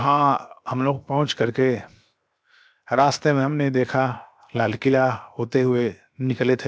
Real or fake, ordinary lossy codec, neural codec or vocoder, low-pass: fake; none; codec, 16 kHz, 0.7 kbps, FocalCodec; none